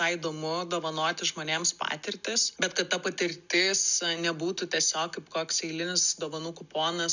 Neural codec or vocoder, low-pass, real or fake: none; 7.2 kHz; real